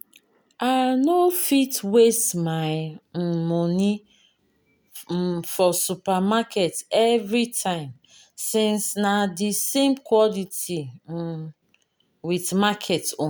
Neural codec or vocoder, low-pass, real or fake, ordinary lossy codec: none; none; real; none